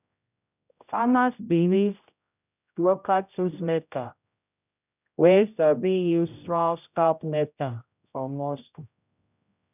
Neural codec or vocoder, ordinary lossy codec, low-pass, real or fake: codec, 16 kHz, 0.5 kbps, X-Codec, HuBERT features, trained on general audio; none; 3.6 kHz; fake